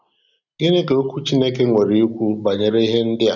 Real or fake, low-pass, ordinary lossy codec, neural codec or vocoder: real; 7.2 kHz; none; none